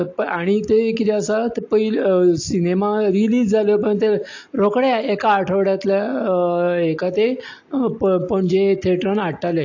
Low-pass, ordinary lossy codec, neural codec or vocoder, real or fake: 7.2 kHz; AAC, 48 kbps; none; real